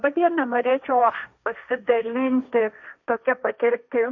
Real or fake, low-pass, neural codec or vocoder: fake; 7.2 kHz; codec, 16 kHz, 1.1 kbps, Voila-Tokenizer